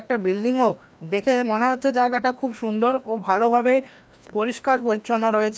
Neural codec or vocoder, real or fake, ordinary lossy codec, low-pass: codec, 16 kHz, 1 kbps, FreqCodec, larger model; fake; none; none